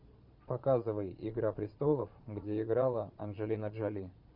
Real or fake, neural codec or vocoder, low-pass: fake; vocoder, 22.05 kHz, 80 mel bands, Vocos; 5.4 kHz